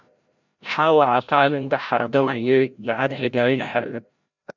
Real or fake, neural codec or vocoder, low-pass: fake; codec, 16 kHz, 0.5 kbps, FreqCodec, larger model; 7.2 kHz